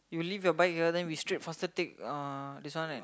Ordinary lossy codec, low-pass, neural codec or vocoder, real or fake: none; none; none; real